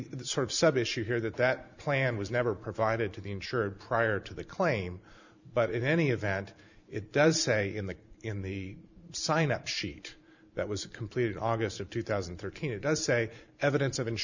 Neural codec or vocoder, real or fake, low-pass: vocoder, 44.1 kHz, 128 mel bands every 512 samples, BigVGAN v2; fake; 7.2 kHz